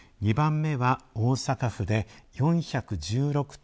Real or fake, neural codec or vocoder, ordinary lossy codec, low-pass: real; none; none; none